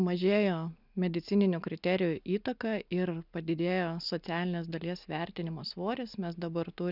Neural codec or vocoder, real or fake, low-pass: none; real; 5.4 kHz